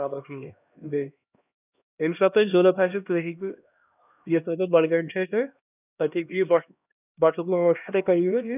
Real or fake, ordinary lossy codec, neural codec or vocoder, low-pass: fake; none; codec, 16 kHz, 1 kbps, X-Codec, HuBERT features, trained on LibriSpeech; 3.6 kHz